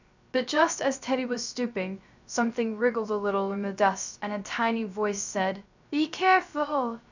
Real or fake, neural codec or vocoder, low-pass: fake; codec, 16 kHz, 0.3 kbps, FocalCodec; 7.2 kHz